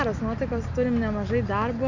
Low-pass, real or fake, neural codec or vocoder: 7.2 kHz; real; none